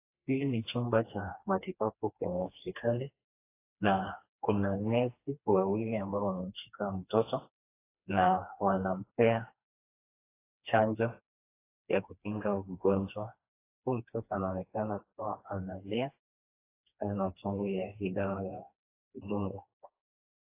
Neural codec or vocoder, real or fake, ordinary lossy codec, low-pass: codec, 16 kHz, 2 kbps, FreqCodec, smaller model; fake; AAC, 24 kbps; 3.6 kHz